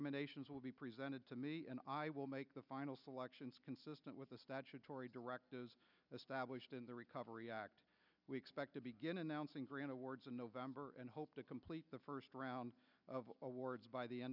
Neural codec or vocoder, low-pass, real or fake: none; 5.4 kHz; real